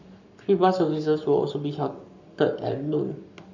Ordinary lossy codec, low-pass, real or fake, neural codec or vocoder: none; 7.2 kHz; fake; codec, 44.1 kHz, 7.8 kbps, Pupu-Codec